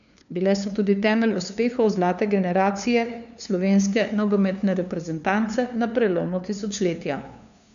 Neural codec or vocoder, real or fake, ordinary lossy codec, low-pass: codec, 16 kHz, 2 kbps, FunCodec, trained on LibriTTS, 25 frames a second; fake; none; 7.2 kHz